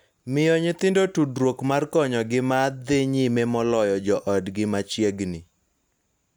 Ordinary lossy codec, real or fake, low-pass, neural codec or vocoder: none; real; none; none